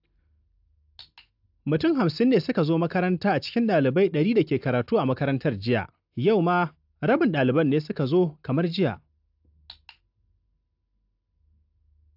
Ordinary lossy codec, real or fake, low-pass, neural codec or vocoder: AAC, 48 kbps; real; 5.4 kHz; none